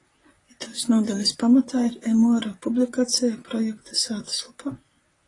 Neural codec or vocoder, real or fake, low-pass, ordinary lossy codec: vocoder, 44.1 kHz, 128 mel bands, Pupu-Vocoder; fake; 10.8 kHz; AAC, 32 kbps